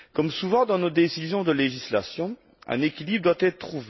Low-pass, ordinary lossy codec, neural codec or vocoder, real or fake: 7.2 kHz; MP3, 24 kbps; none; real